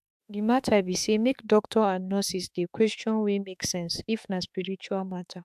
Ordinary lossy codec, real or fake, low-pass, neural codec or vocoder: AAC, 96 kbps; fake; 14.4 kHz; autoencoder, 48 kHz, 32 numbers a frame, DAC-VAE, trained on Japanese speech